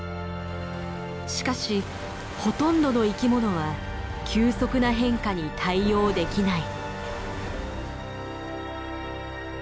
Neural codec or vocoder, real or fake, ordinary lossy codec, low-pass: none; real; none; none